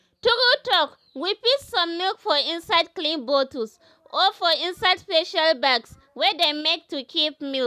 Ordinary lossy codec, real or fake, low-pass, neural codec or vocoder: none; real; 14.4 kHz; none